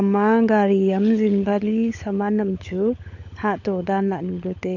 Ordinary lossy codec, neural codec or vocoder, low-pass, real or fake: AAC, 48 kbps; codec, 16 kHz, 16 kbps, FunCodec, trained on LibriTTS, 50 frames a second; 7.2 kHz; fake